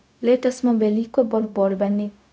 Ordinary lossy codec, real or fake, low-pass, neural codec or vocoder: none; fake; none; codec, 16 kHz, 0.4 kbps, LongCat-Audio-Codec